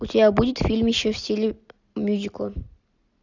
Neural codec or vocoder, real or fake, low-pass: none; real; 7.2 kHz